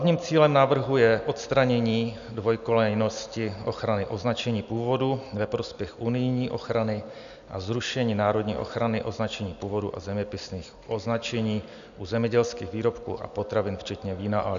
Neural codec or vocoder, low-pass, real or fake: none; 7.2 kHz; real